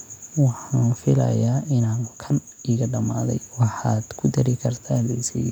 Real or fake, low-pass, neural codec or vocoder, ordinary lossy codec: real; 19.8 kHz; none; none